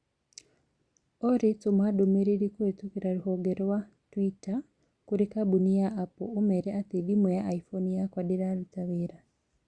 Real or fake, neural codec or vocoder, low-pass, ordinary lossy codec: real; none; 9.9 kHz; Opus, 64 kbps